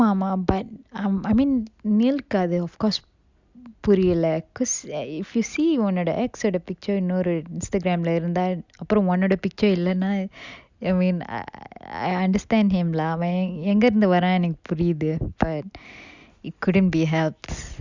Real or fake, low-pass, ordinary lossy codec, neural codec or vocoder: real; 7.2 kHz; Opus, 64 kbps; none